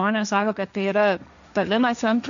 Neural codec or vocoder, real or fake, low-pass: codec, 16 kHz, 1.1 kbps, Voila-Tokenizer; fake; 7.2 kHz